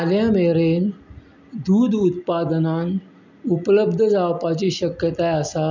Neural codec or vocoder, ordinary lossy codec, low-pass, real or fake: none; none; 7.2 kHz; real